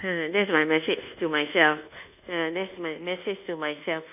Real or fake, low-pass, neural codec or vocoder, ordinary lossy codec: fake; 3.6 kHz; codec, 24 kHz, 1.2 kbps, DualCodec; none